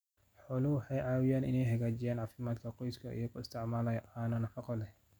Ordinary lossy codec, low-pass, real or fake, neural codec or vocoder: none; none; real; none